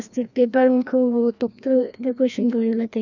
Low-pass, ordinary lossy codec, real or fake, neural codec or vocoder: 7.2 kHz; none; fake; codec, 16 kHz, 1 kbps, FreqCodec, larger model